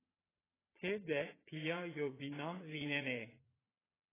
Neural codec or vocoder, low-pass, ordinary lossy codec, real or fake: vocoder, 22.05 kHz, 80 mel bands, Vocos; 3.6 kHz; AAC, 16 kbps; fake